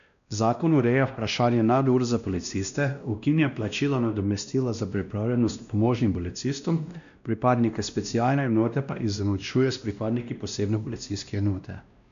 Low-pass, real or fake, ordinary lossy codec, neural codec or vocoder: 7.2 kHz; fake; none; codec, 16 kHz, 1 kbps, X-Codec, WavLM features, trained on Multilingual LibriSpeech